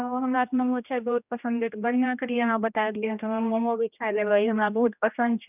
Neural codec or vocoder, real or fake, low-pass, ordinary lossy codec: codec, 16 kHz, 1 kbps, X-Codec, HuBERT features, trained on general audio; fake; 3.6 kHz; none